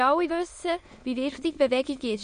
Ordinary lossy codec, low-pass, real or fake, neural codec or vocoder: MP3, 48 kbps; 9.9 kHz; fake; autoencoder, 22.05 kHz, a latent of 192 numbers a frame, VITS, trained on many speakers